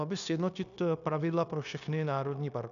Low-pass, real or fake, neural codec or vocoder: 7.2 kHz; fake; codec, 16 kHz, 0.9 kbps, LongCat-Audio-Codec